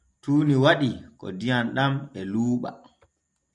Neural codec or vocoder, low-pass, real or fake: none; 10.8 kHz; real